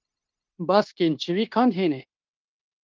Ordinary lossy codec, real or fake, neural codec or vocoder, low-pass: Opus, 32 kbps; fake; codec, 16 kHz, 0.9 kbps, LongCat-Audio-Codec; 7.2 kHz